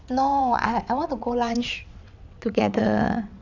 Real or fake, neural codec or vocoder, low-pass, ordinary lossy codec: fake; vocoder, 22.05 kHz, 80 mel bands, WaveNeXt; 7.2 kHz; none